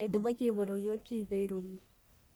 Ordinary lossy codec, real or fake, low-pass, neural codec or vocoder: none; fake; none; codec, 44.1 kHz, 1.7 kbps, Pupu-Codec